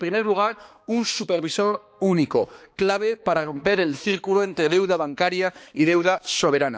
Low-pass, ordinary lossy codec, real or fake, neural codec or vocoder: none; none; fake; codec, 16 kHz, 2 kbps, X-Codec, HuBERT features, trained on balanced general audio